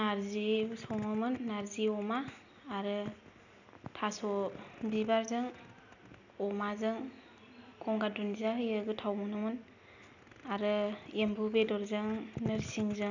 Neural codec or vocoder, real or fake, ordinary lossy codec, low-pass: none; real; none; 7.2 kHz